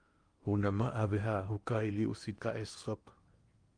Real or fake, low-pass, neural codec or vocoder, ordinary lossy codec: fake; 9.9 kHz; codec, 16 kHz in and 24 kHz out, 0.8 kbps, FocalCodec, streaming, 65536 codes; Opus, 32 kbps